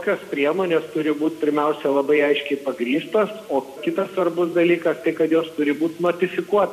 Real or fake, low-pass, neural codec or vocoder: fake; 14.4 kHz; vocoder, 44.1 kHz, 128 mel bands every 512 samples, BigVGAN v2